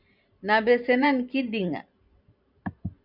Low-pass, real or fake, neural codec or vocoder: 5.4 kHz; fake; vocoder, 44.1 kHz, 128 mel bands every 512 samples, BigVGAN v2